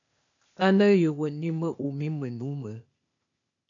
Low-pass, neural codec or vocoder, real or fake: 7.2 kHz; codec, 16 kHz, 0.8 kbps, ZipCodec; fake